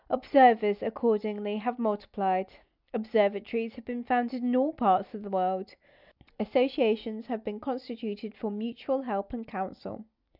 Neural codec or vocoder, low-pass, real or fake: none; 5.4 kHz; real